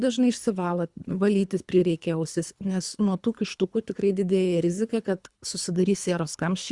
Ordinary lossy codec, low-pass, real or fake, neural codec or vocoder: Opus, 64 kbps; 10.8 kHz; fake; codec, 24 kHz, 3 kbps, HILCodec